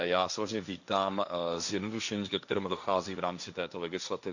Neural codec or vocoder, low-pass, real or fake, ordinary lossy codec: codec, 16 kHz, 1.1 kbps, Voila-Tokenizer; 7.2 kHz; fake; none